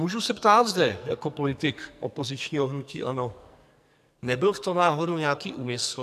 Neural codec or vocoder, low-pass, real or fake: codec, 44.1 kHz, 2.6 kbps, SNAC; 14.4 kHz; fake